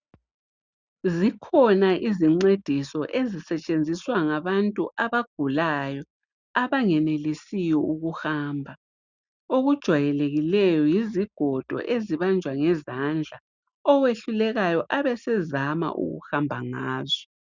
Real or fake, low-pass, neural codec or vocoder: real; 7.2 kHz; none